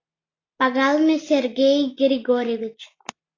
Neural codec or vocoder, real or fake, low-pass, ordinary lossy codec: none; real; 7.2 kHz; AAC, 32 kbps